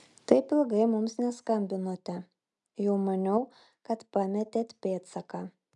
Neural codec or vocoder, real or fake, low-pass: none; real; 10.8 kHz